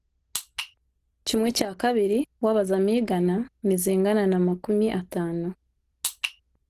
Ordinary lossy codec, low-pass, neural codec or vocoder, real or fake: Opus, 16 kbps; 14.4 kHz; none; real